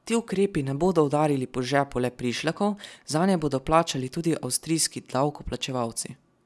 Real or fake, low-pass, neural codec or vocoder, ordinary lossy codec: real; none; none; none